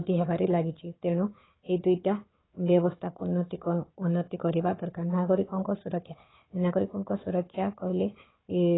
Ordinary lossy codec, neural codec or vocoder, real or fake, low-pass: AAC, 16 kbps; codec, 16 kHz, 4 kbps, FunCodec, trained on Chinese and English, 50 frames a second; fake; 7.2 kHz